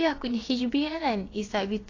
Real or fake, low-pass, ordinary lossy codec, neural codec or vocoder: fake; 7.2 kHz; AAC, 32 kbps; codec, 16 kHz, about 1 kbps, DyCAST, with the encoder's durations